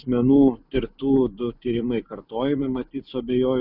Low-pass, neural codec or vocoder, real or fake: 5.4 kHz; none; real